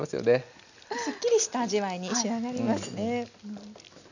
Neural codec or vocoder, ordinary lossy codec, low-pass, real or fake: none; none; 7.2 kHz; real